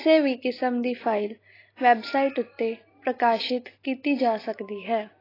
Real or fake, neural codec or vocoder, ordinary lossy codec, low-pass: real; none; AAC, 24 kbps; 5.4 kHz